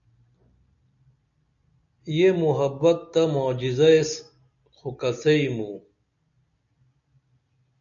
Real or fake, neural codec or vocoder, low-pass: real; none; 7.2 kHz